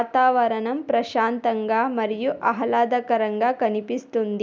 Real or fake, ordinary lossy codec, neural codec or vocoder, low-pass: real; none; none; none